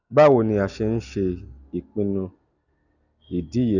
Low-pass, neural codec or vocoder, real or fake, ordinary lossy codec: 7.2 kHz; none; real; none